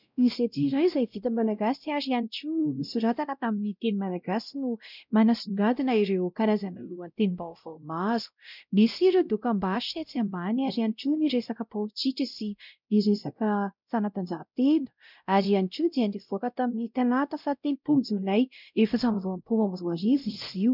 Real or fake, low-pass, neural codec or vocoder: fake; 5.4 kHz; codec, 16 kHz, 0.5 kbps, X-Codec, WavLM features, trained on Multilingual LibriSpeech